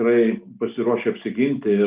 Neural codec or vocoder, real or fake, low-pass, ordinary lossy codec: none; real; 3.6 kHz; Opus, 32 kbps